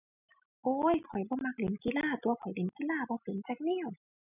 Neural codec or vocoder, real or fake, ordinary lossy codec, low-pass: none; real; none; 3.6 kHz